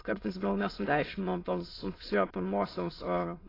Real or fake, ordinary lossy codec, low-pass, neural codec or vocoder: fake; AAC, 24 kbps; 5.4 kHz; autoencoder, 22.05 kHz, a latent of 192 numbers a frame, VITS, trained on many speakers